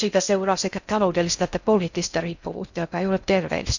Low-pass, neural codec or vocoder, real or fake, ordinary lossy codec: 7.2 kHz; codec, 16 kHz in and 24 kHz out, 0.6 kbps, FocalCodec, streaming, 4096 codes; fake; none